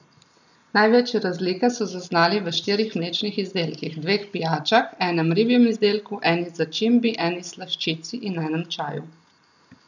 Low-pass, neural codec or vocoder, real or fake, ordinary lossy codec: 7.2 kHz; none; real; none